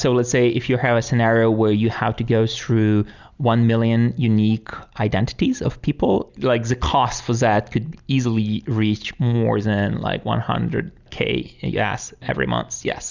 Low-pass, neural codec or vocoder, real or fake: 7.2 kHz; none; real